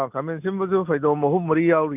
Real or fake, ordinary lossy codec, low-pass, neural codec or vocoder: real; none; 3.6 kHz; none